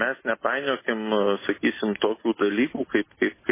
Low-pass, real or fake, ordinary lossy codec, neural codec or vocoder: 3.6 kHz; real; MP3, 16 kbps; none